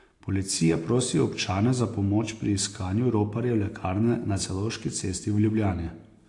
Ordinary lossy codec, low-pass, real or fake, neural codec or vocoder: AAC, 48 kbps; 10.8 kHz; real; none